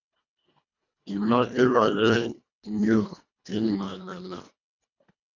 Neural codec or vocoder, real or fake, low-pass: codec, 24 kHz, 1.5 kbps, HILCodec; fake; 7.2 kHz